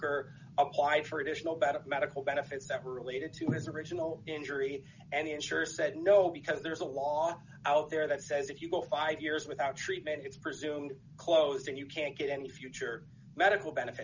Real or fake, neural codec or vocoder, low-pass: real; none; 7.2 kHz